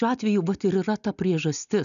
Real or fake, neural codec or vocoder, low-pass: real; none; 7.2 kHz